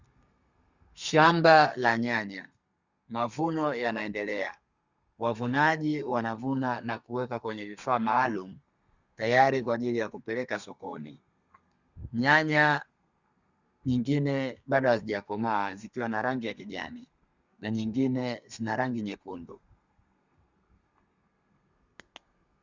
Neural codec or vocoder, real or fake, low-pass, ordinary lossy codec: codec, 32 kHz, 1.9 kbps, SNAC; fake; 7.2 kHz; Opus, 64 kbps